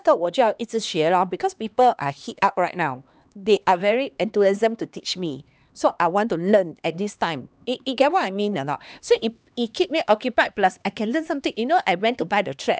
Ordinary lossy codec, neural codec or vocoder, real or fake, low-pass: none; codec, 16 kHz, 2 kbps, X-Codec, HuBERT features, trained on LibriSpeech; fake; none